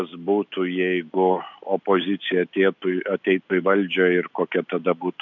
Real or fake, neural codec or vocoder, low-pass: real; none; 7.2 kHz